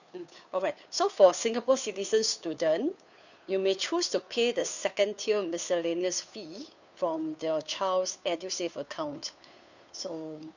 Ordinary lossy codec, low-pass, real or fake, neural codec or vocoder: none; 7.2 kHz; fake; codec, 16 kHz, 2 kbps, FunCodec, trained on Chinese and English, 25 frames a second